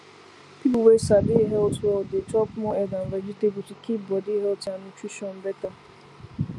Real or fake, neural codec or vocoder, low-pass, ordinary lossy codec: real; none; none; none